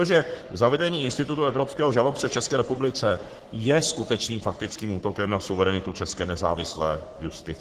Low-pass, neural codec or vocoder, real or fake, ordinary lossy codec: 14.4 kHz; codec, 44.1 kHz, 3.4 kbps, Pupu-Codec; fake; Opus, 16 kbps